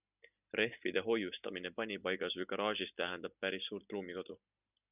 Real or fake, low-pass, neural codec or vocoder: real; 3.6 kHz; none